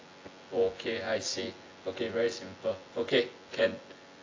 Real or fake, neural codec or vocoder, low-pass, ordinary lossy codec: fake; vocoder, 24 kHz, 100 mel bands, Vocos; 7.2 kHz; AAC, 32 kbps